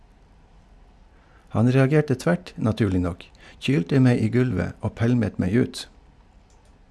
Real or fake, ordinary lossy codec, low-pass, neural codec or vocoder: real; none; none; none